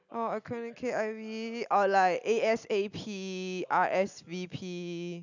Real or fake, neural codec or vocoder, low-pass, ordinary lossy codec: real; none; 7.2 kHz; none